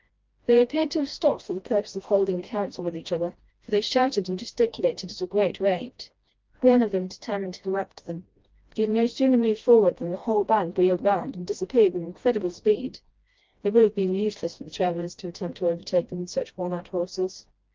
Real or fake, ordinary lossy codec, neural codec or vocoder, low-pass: fake; Opus, 32 kbps; codec, 16 kHz, 1 kbps, FreqCodec, smaller model; 7.2 kHz